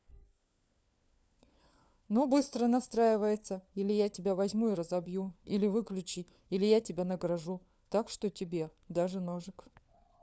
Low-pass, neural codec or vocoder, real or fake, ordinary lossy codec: none; codec, 16 kHz, 4 kbps, FunCodec, trained on LibriTTS, 50 frames a second; fake; none